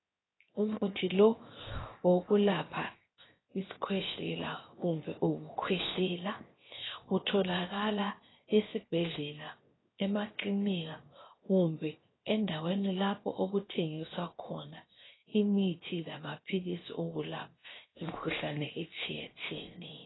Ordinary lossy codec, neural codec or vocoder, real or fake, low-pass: AAC, 16 kbps; codec, 16 kHz, 0.7 kbps, FocalCodec; fake; 7.2 kHz